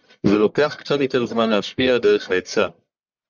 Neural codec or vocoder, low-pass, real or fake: codec, 44.1 kHz, 1.7 kbps, Pupu-Codec; 7.2 kHz; fake